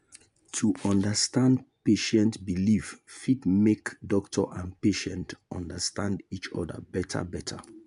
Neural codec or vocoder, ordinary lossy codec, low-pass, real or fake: vocoder, 24 kHz, 100 mel bands, Vocos; none; 10.8 kHz; fake